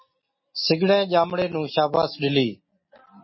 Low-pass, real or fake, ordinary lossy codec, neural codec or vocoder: 7.2 kHz; real; MP3, 24 kbps; none